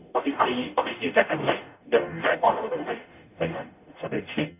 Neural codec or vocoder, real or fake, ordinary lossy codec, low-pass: codec, 44.1 kHz, 0.9 kbps, DAC; fake; none; 3.6 kHz